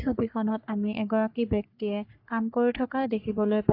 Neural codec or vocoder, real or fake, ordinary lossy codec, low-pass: codec, 44.1 kHz, 3.4 kbps, Pupu-Codec; fake; AAC, 32 kbps; 5.4 kHz